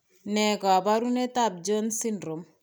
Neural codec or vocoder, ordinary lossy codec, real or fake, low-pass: none; none; real; none